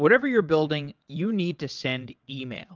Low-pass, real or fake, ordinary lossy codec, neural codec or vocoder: 7.2 kHz; fake; Opus, 24 kbps; vocoder, 22.05 kHz, 80 mel bands, WaveNeXt